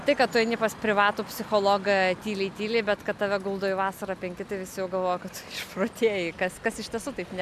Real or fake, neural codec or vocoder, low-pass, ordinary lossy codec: real; none; 14.4 kHz; MP3, 96 kbps